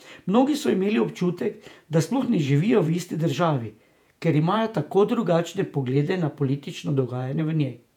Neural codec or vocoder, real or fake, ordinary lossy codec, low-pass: vocoder, 48 kHz, 128 mel bands, Vocos; fake; none; 19.8 kHz